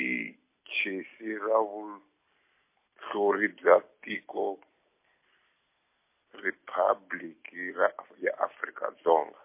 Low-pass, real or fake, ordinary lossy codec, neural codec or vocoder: 3.6 kHz; real; MP3, 24 kbps; none